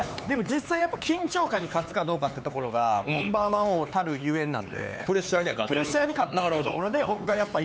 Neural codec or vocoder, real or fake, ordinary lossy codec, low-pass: codec, 16 kHz, 4 kbps, X-Codec, HuBERT features, trained on LibriSpeech; fake; none; none